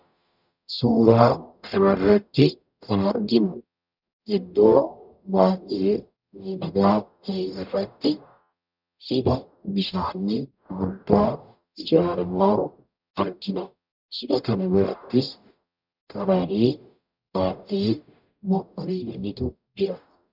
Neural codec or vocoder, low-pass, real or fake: codec, 44.1 kHz, 0.9 kbps, DAC; 5.4 kHz; fake